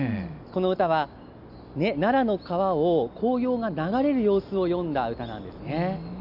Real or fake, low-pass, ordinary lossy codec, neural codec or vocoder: real; 5.4 kHz; none; none